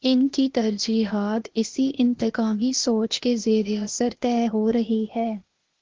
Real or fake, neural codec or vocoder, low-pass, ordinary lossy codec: fake; codec, 16 kHz, 0.8 kbps, ZipCodec; 7.2 kHz; Opus, 16 kbps